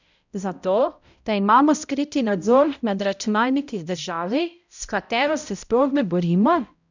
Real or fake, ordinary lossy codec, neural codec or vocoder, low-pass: fake; none; codec, 16 kHz, 0.5 kbps, X-Codec, HuBERT features, trained on balanced general audio; 7.2 kHz